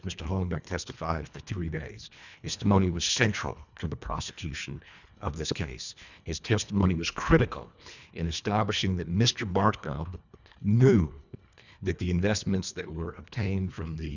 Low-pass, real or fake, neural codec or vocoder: 7.2 kHz; fake; codec, 24 kHz, 1.5 kbps, HILCodec